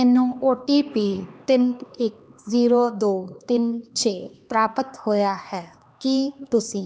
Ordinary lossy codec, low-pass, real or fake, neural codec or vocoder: none; none; fake; codec, 16 kHz, 2 kbps, X-Codec, HuBERT features, trained on LibriSpeech